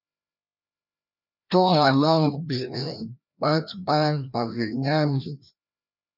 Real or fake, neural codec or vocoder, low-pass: fake; codec, 16 kHz, 1 kbps, FreqCodec, larger model; 5.4 kHz